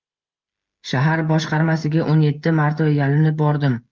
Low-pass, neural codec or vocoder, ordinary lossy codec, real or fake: 7.2 kHz; codec, 16 kHz, 16 kbps, FreqCodec, smaller model; Opus, 32 kbps; fake